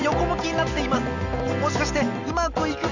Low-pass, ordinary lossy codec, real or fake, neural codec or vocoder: 7.2 kHz; none; real; none